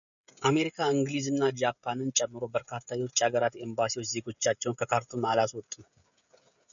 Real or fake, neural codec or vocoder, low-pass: fake; codec, 16 kHz, 16 kbps, FreqCodec, smaller model; 7.2 kHz